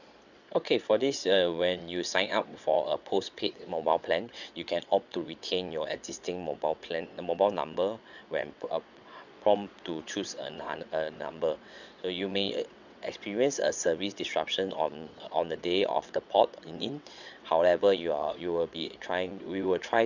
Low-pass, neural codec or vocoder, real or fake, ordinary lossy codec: 7.2 kHz; vocoder, 22.05 kHz, 80 mel bands, WaveNeXt; fake; none